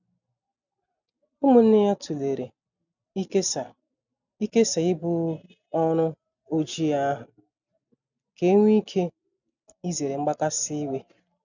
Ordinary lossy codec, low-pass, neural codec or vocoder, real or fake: none; 7.2 kHz; none; real